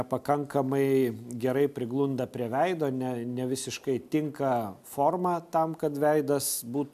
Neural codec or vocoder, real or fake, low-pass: none; real; 14.4 kHz